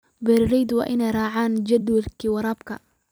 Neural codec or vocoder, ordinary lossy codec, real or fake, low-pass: vocoder, 44.1 kHz, 128 mel bands every 256 samples, BigVGAN v2; none; fake; none